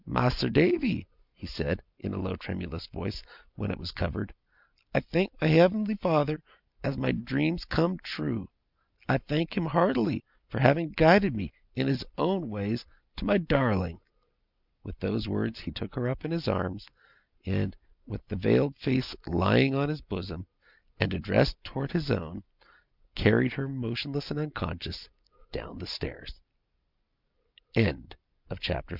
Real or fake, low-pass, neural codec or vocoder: real; 5.4 kHz; none